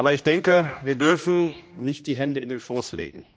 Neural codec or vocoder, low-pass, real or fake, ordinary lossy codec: codec, 16 kHz, 1 kbps, X-Codec, HuBERT features, trained on general audio; none; fake; none